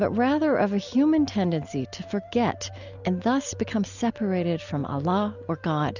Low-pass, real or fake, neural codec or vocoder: 7.2 kHz; real; none